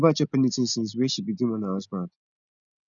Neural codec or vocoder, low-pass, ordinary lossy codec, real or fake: none; 7.2 kHz; none; real